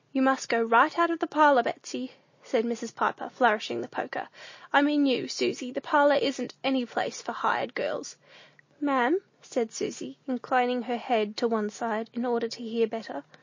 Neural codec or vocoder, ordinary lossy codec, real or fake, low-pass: none; MP3, 32 kbps; real; 7.2 kHz